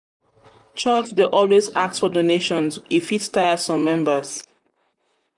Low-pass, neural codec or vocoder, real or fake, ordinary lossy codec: 10.8 kHz; vocoder, 44.1 kHz, 128 mel bands, Pupu-Vocoder; fake; none